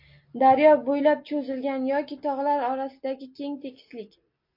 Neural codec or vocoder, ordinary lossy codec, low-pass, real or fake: none; MP3, 32 kbps; 5.4 kHz; real